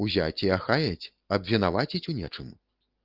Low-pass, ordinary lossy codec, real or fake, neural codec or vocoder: 5.4 kHz; Opus, 32 kbps; real; none